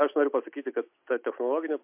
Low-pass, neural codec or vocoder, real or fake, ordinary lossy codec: 3.6 kHz; none; real; MP3, 32 kbps